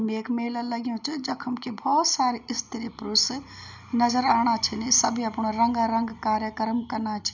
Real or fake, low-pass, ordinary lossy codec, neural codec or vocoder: real; 7.2 kHz; none; none